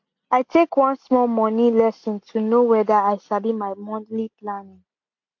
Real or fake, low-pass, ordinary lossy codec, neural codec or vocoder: real; 7.2 kHz; AAC, 48 kbps; none